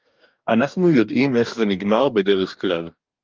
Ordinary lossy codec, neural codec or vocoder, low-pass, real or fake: Opus, 24 kbps; codec, 44.1 kHz, 2.6 kbps, DAC; 7.2 kHz; fake